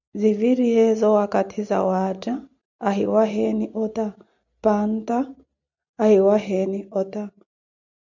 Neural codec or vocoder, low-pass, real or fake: none; 7.2 kHz; real